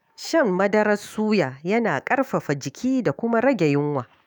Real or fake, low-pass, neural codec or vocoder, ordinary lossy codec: fake; none; autoencoder, 48 kHz, 128 numbers a frame, DAC-VAE, trained on Japanese speech; none